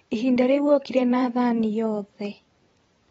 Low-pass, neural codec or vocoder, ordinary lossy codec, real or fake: 19.8 kHz; vocoder, 44.1 kHz, 128 mel bands every 512 samples, BigVGAN v2; AAC, 24 kbps; fake